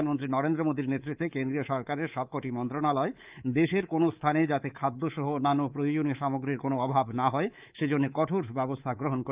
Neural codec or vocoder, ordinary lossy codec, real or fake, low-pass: codec, 24 kHz, 3.1 kbps, DualCodec; Opus, 24 kbps; fake; 3.6 kHz